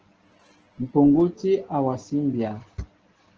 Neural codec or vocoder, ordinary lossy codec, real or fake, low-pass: none; Opus, 16 kbps; real; 7.2 kHz